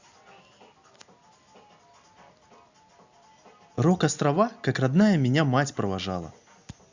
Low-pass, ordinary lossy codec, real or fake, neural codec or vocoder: 7.2 kHz; Opus, 64 kbps; real; none